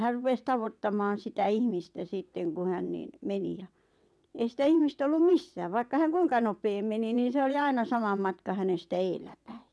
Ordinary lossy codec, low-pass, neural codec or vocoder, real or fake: none; none; vocoder, 22.05 kHz, 80 mel bands, WaveNeXt; fake